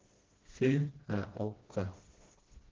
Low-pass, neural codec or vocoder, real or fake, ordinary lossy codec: 7.2 kHz; codec, 16 kHz, 1 kbps, FreqCodec, smaller model; fake; Opus, 16 kbps